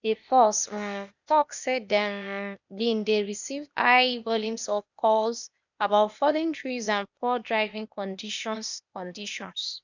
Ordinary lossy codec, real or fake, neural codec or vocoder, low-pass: none; fake; codec, 16 kHz, 0.8 kbps, ZipCodec; 7.2 kHz